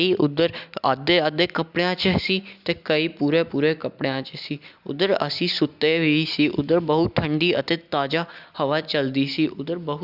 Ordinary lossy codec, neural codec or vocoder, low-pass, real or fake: Opus, 64 kbps; none; 5.4 kHz; real